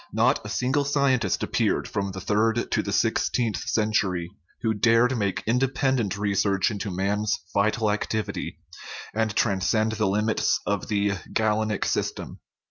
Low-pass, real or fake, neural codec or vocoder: 7.2 kHz; real; none